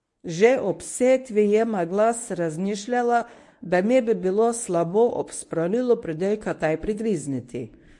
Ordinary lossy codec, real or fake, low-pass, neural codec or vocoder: MP3, 48 kbps; fake; 10.8 kHz; codec, 24 kHz, 0.9 kbps, WavTokenizer, medium speech release version 1